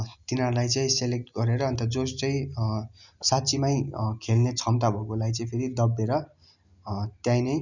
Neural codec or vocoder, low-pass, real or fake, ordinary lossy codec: none; 7.2 kHz; real; none